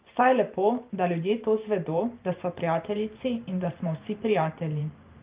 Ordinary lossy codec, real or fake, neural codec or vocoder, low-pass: Opus, 24 kbps; fake; vocoder, 44.1 kHz, 128 mel bands every 512 samples, BigVGAN v2; 3.6 kHz